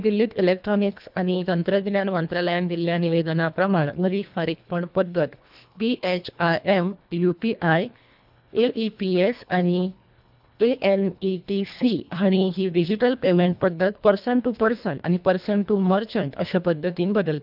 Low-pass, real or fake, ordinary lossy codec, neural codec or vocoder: 5.4 kHz; fake; none; codec, 24 kHz, 1.5 kbps, HILCodec